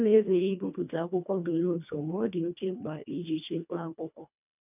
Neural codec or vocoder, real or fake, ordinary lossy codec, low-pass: codec, 24 kHz, 1.5 kbps, HILCodec; fake; none; 3.6 kHz